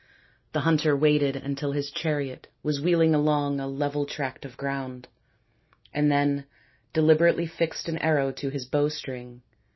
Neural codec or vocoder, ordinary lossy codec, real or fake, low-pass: none; MP3, 24 kbps; real; 7.2 kHz